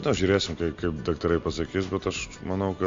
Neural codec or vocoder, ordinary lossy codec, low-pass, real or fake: none; MP3, 48 kbps; 7.2 kHz; real